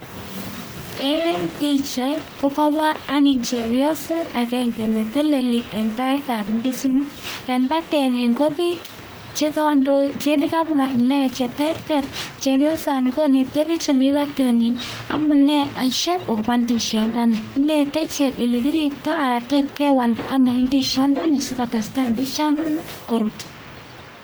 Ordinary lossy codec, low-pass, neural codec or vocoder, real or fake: none; none; codec, 44.1 kHz, 1.7 kbps, Pupu-Codec; fake